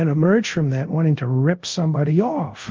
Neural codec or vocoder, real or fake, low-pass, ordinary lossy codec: codec, 24 kHz, 0.5 kbps, DualCodec; fake; 7.2 kHz; Opus, 32 kbps